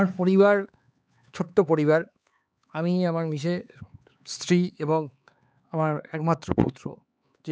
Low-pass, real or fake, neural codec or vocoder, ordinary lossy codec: none; fake; codec, 16 kHz, 4 kbps, X-Codec, HuBERT features, trained on LibriSpeech; none